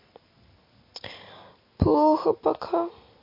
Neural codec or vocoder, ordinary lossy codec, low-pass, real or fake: none; MP3, 32 kbps; 5.4 kHz; real